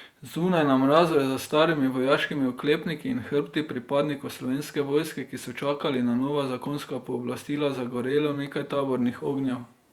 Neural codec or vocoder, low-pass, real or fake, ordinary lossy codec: vocoder, 48 kHz, 128 mel bands, Vocos; 19.8 kHz; fake; Opus, 64 kbps